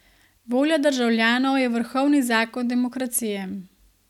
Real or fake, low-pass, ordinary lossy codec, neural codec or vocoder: real; 19.8 kHz; none; none